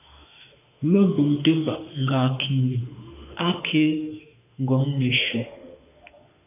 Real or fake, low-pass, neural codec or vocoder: fake; 3.6 kHz; autoencoder, 48 kHz, 32 numbers a frame, DAC-VAE, trained on Japanese speech